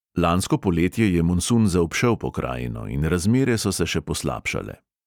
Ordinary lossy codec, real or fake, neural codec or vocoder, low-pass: none; real; none; 19.8 kHz